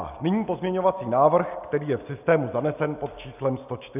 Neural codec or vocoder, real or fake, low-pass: none; real; 3.6 kHz